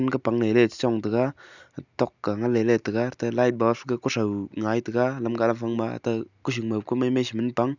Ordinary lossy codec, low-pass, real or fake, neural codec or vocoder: none; 7.2 kHz; real; none